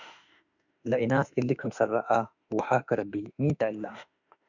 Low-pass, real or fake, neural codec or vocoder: 7.2 kHz; fake; autoencoder, 48 kHz, 32 numbers a frame, DAC-VAE, trained on Japanese speech